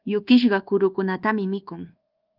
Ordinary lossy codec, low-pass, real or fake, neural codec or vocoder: Opus, 32 kbps; 5.4 kHz; fake; codec, 24 kHz, 1.2 kbps, DualCodec